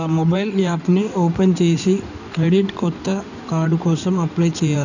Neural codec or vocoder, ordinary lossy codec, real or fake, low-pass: codec, 16 kHz in and 24 kHz out, 2.2 kbps, FireRedTTS-2 codec; none; fake; 7.2 kHz